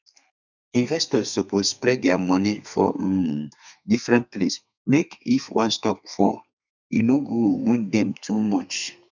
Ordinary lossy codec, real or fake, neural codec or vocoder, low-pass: none; fake; codec, 32 kHz, 1.9 kbps, SNAC; 7.2 kHz